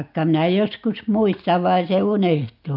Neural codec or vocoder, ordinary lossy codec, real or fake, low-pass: none; none; real; 5.4 kHz